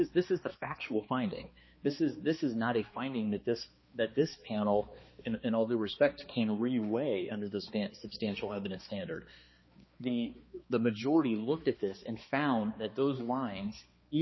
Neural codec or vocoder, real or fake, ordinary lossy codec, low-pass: codec, 16 kHz, 2 kbps, X-Codec, HuBERT features, trained on balanced general audio; fake; MP3, 24 kbps; 7.2 kHz